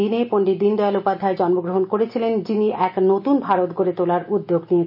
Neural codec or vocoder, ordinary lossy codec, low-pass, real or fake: none; none; 5.4 kHz; real